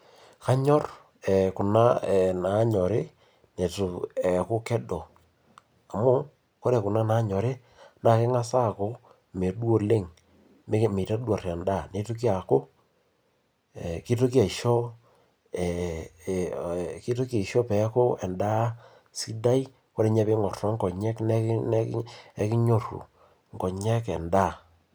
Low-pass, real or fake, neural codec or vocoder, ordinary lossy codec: none; real; none; none